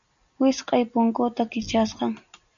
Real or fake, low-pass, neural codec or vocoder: real; 7.2 kHz; none